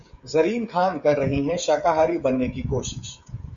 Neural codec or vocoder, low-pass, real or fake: codec, 16 kHz, 16 kbps, FreqCodec, smaller model; 7.2 kHz; fake